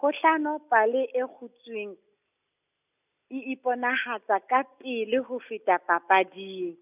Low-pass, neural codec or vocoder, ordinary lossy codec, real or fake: 3.6 kHz; none; none; real